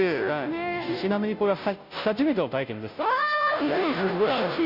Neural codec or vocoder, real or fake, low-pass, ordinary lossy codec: codec, 16 kHz, 0.5 kbps, FunCodec, trained on Chinese and English, 25 frames a second; fake; 5.4 kHz; none